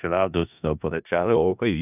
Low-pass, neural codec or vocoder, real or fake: 3.6 kHz; codec, 16 kHz in and 24 kHz out, 0.4 kbps, LongCat-Audio-Codec, four codebook decoder; fake